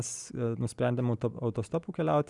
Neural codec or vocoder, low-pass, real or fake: none; 10.8 kHz; real